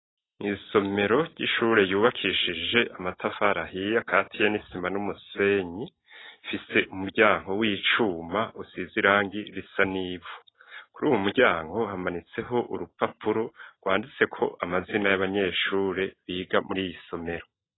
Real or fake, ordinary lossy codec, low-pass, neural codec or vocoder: real; AAC, 16 kbps; 7.2 kHz; none